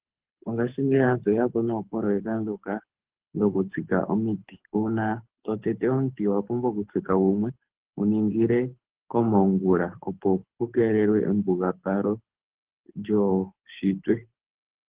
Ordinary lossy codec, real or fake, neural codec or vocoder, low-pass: Opus, 16 kbps; fake; codec, 24 kHz, 6 kbps, HILCodec; 3.6 kHz